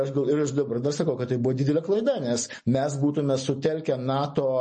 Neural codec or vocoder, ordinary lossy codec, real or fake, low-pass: none; MP3, 32 kbps; real; 10.8 kHz